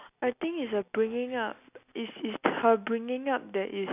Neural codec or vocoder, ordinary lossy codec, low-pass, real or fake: none; none; 3.6 kHz; real